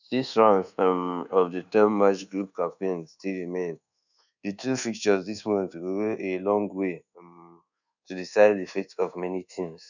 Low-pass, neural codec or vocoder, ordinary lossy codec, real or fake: 7.2 kHz; codec, 24 kHz, 1.2 kbps, DualCodec; none; fake